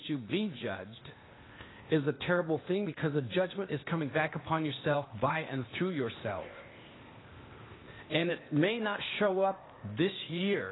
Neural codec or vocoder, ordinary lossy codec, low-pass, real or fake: codec, 16 kHz, 0.8 kbps, ZipCodec; AAC, 16 kbps; 7.2 kHz; fake